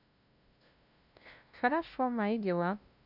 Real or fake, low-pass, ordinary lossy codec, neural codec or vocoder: fake; 5.4 kHz; none; codec, 16 kHz, 0.5 kbps, FunCodec, trained on LibriTTS, 25 frames a second